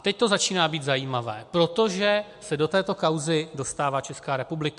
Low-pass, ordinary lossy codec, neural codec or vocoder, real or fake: 10.8 kHz; MP3, 64 kbps; none; real